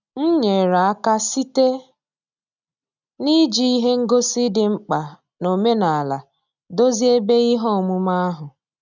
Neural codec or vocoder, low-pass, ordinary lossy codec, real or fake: none; 7.2 kHz; none; real